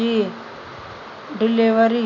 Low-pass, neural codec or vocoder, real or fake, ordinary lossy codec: 7.2 kHz; none; real; none